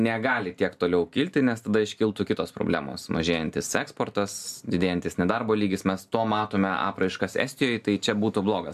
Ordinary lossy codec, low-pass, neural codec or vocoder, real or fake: AAC, 96 kbps; 14.4 kHz; none; real